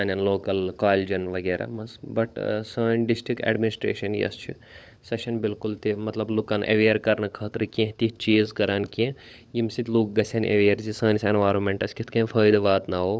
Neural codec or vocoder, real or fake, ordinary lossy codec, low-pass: codec, 16 kHz, 16 kbps, FunCodec, trained on LibriTTS, 50 frames a second; fake; none; none